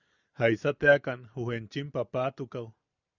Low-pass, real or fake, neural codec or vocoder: 7.2 kHz; real; none